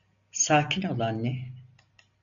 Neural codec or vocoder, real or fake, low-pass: none; real; 7.2 kHz